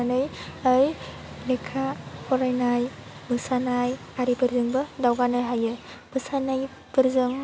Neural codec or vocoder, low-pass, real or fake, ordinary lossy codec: none; none; real; none